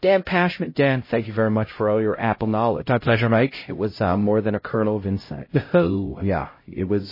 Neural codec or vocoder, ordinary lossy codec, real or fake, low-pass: codec, 16 kHz, 0.5 kbps, X-Codec, HuBERT features, trained on LibriSpeech; MP3, 24 kbps; fake; 5.4 kHz